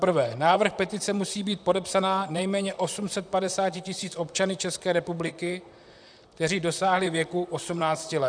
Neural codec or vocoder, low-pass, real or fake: vocoder, 44.1 kHz, 128 mel bands, Pupu-Vocoder; 9.9 kHz; fake